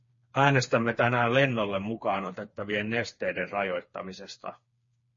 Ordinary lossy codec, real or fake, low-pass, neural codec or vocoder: MP3, 32 kbps; fake; 7.2 kHz; codec, 16 kHz, 4 kbps, FreqCodec, smaller model